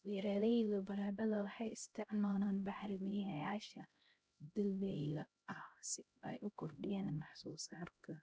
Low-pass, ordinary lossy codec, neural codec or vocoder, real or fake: none; none; codec, 16 kHz, 0.5 kbps, X-Codec, HuBERT features, trained on LibriSpeech; fake